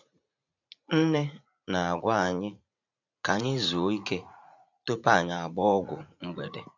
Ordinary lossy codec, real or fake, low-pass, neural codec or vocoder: none; fake; 7.2 kHz; vocoder, 44.1 kHz, 80 mel bands, Vocos